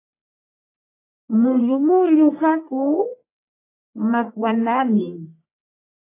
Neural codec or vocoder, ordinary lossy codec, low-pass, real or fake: codec, 44.1 kHz, 1.7 kbps, Pupu-Codec; AAC, 24 kbps; 3.6 kHz; fake